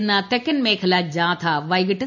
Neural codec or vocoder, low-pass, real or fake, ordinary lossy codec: none; 7.2 kHz; real; none